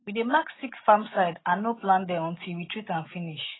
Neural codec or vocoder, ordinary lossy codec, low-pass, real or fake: none; AAC, 16 kbps; 7.2 kHz; real